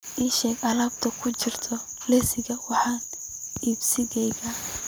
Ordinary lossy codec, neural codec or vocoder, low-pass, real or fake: none; none; none; real